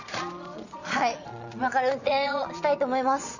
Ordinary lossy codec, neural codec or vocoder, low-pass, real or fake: none; vocoder, 22.05 kHz, 80 mel bands, Vocos; 7.2 kHz; fake